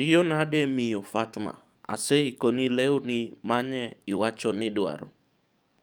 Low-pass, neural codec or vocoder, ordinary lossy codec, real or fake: none; codec, 44.1 kHz, 7.8 kbps, DAC; none; fake